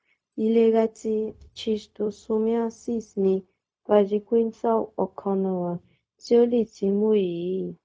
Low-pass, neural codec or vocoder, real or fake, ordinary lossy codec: none; codec, 16 kHz, 0.4 kbps, LongCat-Audio-Codec; fake; none